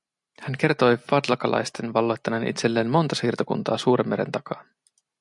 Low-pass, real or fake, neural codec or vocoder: 10.8 kHz; real; none